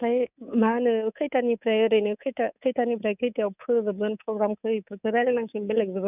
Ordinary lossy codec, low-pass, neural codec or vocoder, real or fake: none; 3.6 kHz; codec, 24 kHz, 3.1 kbps, DualCodec; fake